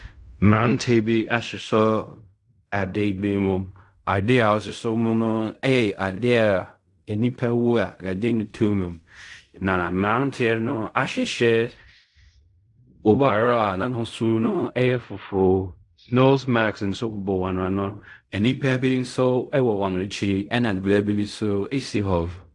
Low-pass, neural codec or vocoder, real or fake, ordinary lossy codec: 10.8 kHz; codec, 16 kHz in and 24 kHz out, 0.4 kbps, LongCat-Audio-Codec, fine tuned four codebook decoder; fake; AAC, 64 kbps